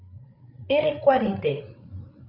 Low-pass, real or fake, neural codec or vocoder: 5.4 kHz; fake; codec, 16 kHz, 8 kbps, FreqCodec, larger model